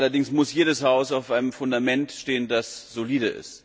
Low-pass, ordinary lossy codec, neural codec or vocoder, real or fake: none; none; none; real